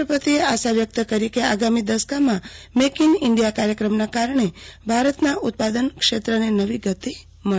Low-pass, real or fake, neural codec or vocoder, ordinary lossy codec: none; real; none; none